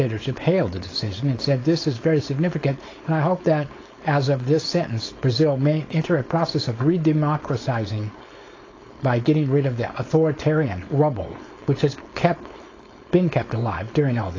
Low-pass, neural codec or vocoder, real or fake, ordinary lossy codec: 7.2 kHz; codec, 16 kHz, 4.8 kbps, FACodec; fake; AAC, 32 kbps